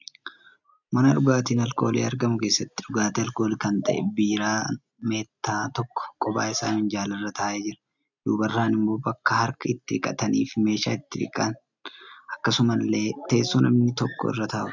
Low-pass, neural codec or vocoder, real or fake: 7.2 kHz; none; real